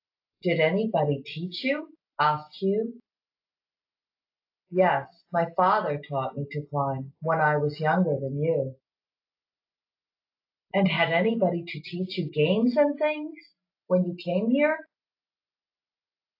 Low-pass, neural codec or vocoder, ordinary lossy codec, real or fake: 5.4 kHz; none; AAC, 32 kbps; real